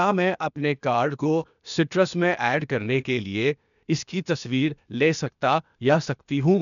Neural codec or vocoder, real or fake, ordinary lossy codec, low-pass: codec, 16 kHz, 0.8 kbps, ZipCodec; fake; none; 7.2 kHz